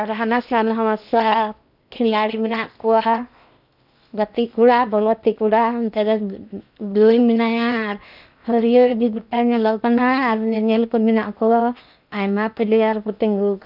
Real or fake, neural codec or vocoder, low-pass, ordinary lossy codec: fake; codec, 16 kHz in and 24 kHz out, 0.8 kbps, FocalCodec, streaming, 65536 codes; 5.4 kHz; none